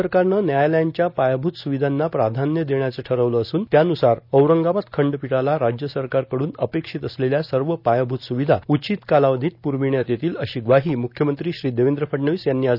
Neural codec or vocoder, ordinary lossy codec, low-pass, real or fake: none; none; 5.4 kHz; real